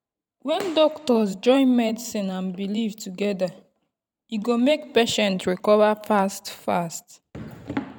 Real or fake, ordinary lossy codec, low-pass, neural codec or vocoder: fake; none; 19.8 kHz; vocoder, 44.1 kHz, 128 mel bands every 512 samples, BigVGAN v2